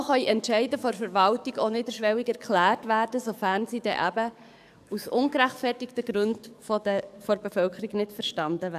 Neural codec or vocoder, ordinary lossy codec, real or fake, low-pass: codec, 44.1 kHz, 7.8 kbps, DAC; none; fake; 14.4 kHz